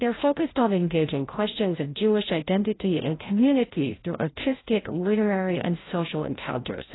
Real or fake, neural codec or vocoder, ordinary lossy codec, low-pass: fake; codec, 16 kHz, 0.5 kbps, FreqCodec, larger model; AAC, 16 kbps; 7.2 kHz